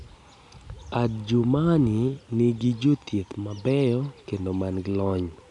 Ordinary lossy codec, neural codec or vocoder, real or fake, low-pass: none; none; real; 10.8 kHz